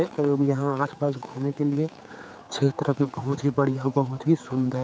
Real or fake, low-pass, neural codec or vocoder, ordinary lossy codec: fake; none; codec, 16 kHz, 4 kbps, X-Codec, HuBERT features, trained on general audio; none